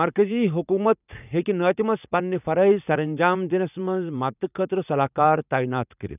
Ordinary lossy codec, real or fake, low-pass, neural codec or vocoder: none; fake; 3.6 kHz; vocoder, 44.1 kHz, 80 mel bands, Vocos